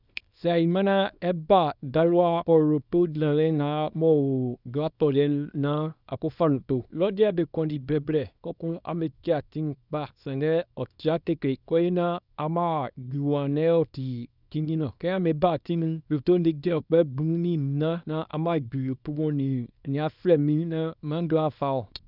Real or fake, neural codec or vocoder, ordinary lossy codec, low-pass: fake; codec, 24 kHz, 0.9 kbps, WavTokenizer, small release; none; 5.4 kHz